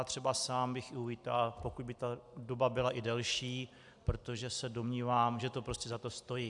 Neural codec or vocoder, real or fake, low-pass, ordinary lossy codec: none; real; 10.8 kHz; AAC, 64 kbps